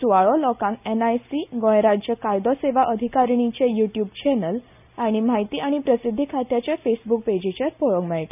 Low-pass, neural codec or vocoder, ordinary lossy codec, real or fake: 3.6 kHz; none; none; real